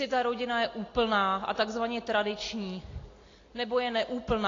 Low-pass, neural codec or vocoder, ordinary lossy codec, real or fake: 7.2 kHz; none; AAC, 32 kbps; real